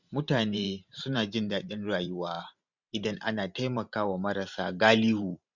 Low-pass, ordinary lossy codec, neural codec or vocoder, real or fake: 7.2 kHz; none; vocoder, 44.1 kHz, 128 mel bands every 512 samples, BigVGAN v2; fake